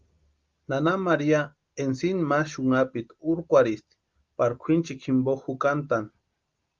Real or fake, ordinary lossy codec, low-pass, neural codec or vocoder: real; Opus, 32 kbps; 7.2 kHz; none